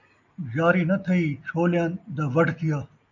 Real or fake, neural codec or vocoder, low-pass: real; none; 7.2 kHz